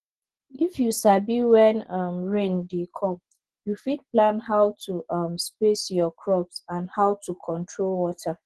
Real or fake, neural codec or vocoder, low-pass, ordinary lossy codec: real; none; 10.8 kHz; Opus, 16 kbps